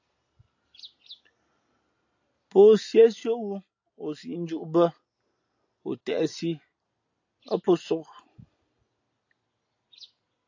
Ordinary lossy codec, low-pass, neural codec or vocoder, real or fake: AAC, 48 kbps; 7.2 kHz; none; real